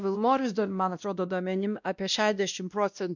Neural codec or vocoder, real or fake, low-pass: codec, 16 kHz, 1 kbps, X-Codec, WavLM features, trained on Multilingual LibriSpeech; fake; 7.2 kHz